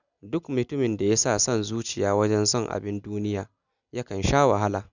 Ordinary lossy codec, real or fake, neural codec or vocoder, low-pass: none; real; none; 7.2 kHz